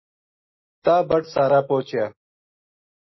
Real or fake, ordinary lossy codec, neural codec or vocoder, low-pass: real; MP3, 24 kbps; none; 7.2 kHz